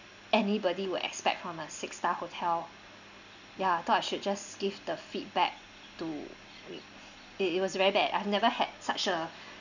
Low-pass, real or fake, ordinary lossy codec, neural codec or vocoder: 7.2 kHz; real; none; none